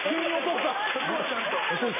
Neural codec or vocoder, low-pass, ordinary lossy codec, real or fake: none; 3.6 kHz; MP3, 16 kbps; real